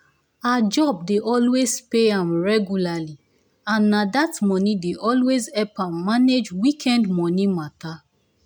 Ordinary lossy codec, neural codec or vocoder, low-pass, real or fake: none; none; none; real